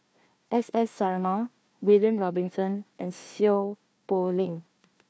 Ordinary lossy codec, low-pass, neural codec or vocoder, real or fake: none; none; codec, 16 kHz, 1 kbps, FunCodec, trained on Chinese and English, 50 frames a second; fake